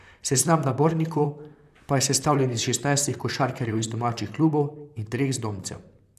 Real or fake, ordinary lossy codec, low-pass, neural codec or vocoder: fake; none; 14.4 kHz; vocoder, 44.1 kHz, 128 mel bands, Pupu-Vocoder